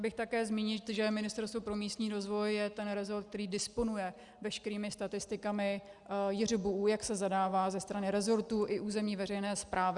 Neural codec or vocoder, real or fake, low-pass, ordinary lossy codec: none; real; 10.8 kHz; Opus, 64 kbps